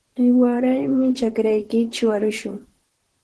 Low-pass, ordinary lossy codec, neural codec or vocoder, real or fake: 10.8 kHz; Opus, 16 kbps; vocoder, 44.1 kHz, 128 mel bands, Pupu-Vocoder; fake